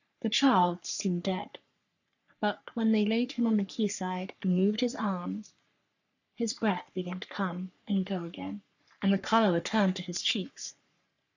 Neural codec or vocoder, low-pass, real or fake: codec, 44.1 kHz, 3.4 kbps, Pupu-Codec; 7.2 kHz; fake